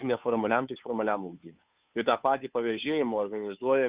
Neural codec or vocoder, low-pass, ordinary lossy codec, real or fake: codec, 16 kHz, 2 kbps, FunCodec, trained on Chinese and English, 25 frames a second; 3.6 kHz; Opus, 64 kbps; fake